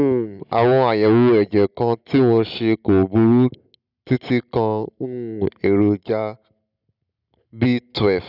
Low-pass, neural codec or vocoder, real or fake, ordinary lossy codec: 5.4 kHz; none; real; none